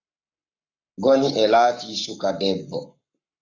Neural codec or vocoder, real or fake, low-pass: codec, 44.1 kHz, 7.8 kbps, Pupu-Codec; fake; 7.2 kHz